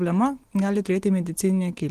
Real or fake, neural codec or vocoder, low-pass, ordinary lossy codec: real; none; 14.4 kHz; Opus, 16 kbps